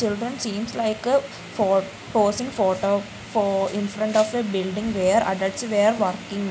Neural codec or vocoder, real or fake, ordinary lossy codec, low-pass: none; real; none; none